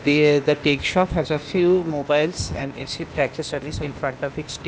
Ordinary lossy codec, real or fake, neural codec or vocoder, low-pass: none; fake; codec, 16 kHz, 0.8 kbps, ZipCodec; none